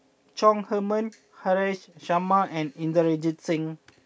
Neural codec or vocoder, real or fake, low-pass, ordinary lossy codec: none; real; none; none